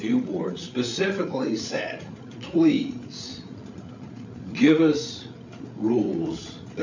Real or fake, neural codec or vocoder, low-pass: fake; codec, 16 kHz, 8 kbps, FreqCodec, larger model; 7.2 kHz